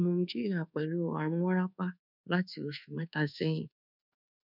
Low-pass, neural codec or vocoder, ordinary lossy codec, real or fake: 5.4 kHz; codec, 24 kHz, 1.2 kbps, DualCodec; none; fake